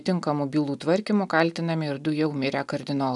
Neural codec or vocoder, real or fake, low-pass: none; real; 10.8 kHz